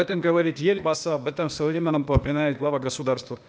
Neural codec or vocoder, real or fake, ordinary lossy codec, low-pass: codec, 16 kHz, 0.8 kbps, ZipCodec; fake; none; none